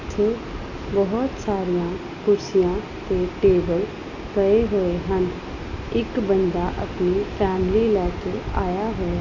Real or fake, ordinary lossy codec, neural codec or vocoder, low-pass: real; none; none; 7.2 kHz